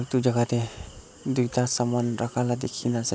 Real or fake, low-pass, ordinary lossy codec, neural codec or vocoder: real; none; none; none